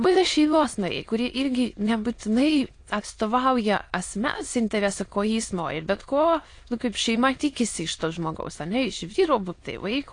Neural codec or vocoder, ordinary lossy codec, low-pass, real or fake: autoencoder, 22.05 kHz, a latent of 192 numbers a frame, VITS, trained on many speakers; AAC, 48 kbps; 9.9 kHz; fake